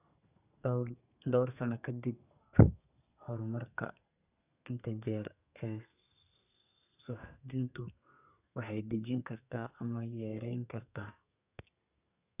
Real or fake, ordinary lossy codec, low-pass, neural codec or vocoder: fake; none; 3.6 kHz; codec, 32 kHz, 1.9 kbps, SNAC